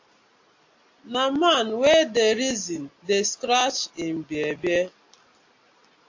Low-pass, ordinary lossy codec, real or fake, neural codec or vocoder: 7.2 kHz; AAC, 48 kbps; real; none